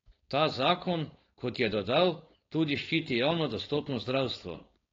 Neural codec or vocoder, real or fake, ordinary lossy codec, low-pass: codec, 16 kHz, 4.8 kbps, FACodec; fake; AAC, 32 kbps; 7.2 kHz